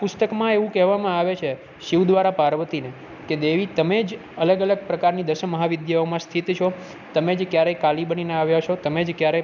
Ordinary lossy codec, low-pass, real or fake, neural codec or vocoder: none; 7.2 kHz; real; none